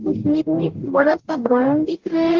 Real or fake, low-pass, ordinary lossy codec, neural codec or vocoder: fake; 7.2 kHz; Opus, 16 kbps; codec, 44.1 kHz, 0.9 kbps, DAC